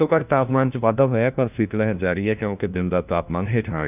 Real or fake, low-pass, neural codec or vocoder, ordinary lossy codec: fake; 3.6 kHz; codec, 16 kHz, 1 kbps, FunCodec, trained on LibriTTS, 50 frames a second; none